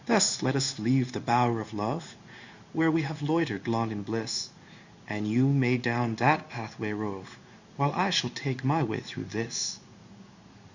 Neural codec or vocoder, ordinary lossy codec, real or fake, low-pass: codec, 16 kHz in and 24 kHz out, 1 kbps, XY-Tokenizer; Opus, 64 kbps; fake; 7.2 kHz